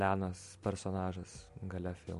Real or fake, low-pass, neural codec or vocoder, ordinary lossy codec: real; 14.4 kHz; none; MP3, 48 kbps